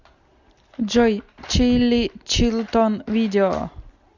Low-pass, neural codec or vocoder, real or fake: 7.2 kHz; none; real